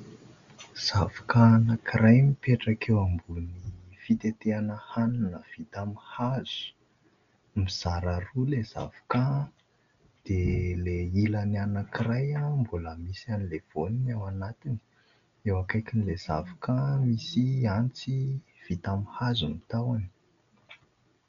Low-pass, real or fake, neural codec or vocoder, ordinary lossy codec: 7.2 kHz; real; none; MP3, 64 kbps